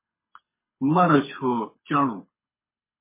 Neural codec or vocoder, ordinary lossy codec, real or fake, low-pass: codec, 24 kHz, 6 kbps, HILCodec; MP3, 16 kbps; fake; 3.6 kHz